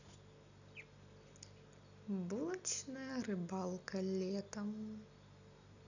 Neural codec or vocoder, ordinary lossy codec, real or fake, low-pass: none; none; real; 7.2 kHz